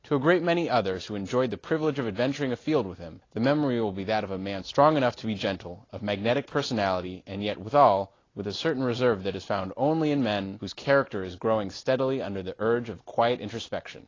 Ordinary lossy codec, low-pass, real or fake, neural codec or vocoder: AAC, 32 kbps; 7.2 kHz; real; none